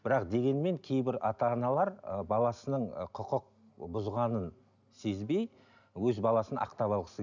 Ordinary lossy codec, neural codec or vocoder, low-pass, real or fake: none; none; 7.2 kHz; real